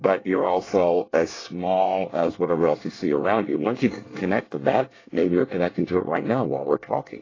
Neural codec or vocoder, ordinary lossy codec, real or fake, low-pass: codec, 24 kHz, 1 kbps, SNAC; AAC, 32 kbps; fake; 7.2 kHz